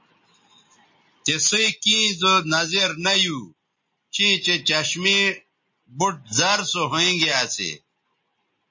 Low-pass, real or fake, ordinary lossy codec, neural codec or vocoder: 7.2 kHz; real; MP3, 32 kbps; none